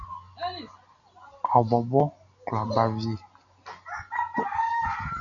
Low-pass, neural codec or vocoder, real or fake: 7.2 kHz; none; real